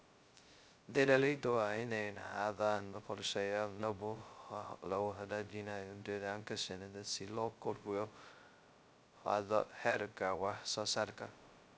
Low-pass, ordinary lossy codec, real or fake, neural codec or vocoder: none; none; fake; codec, 16 kHz, 0.2 kbps, FocalCodec